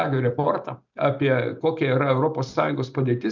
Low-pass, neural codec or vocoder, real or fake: 7.2 kHz; none; real